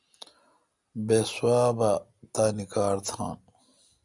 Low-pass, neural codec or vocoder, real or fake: 10.8 kHz; none; real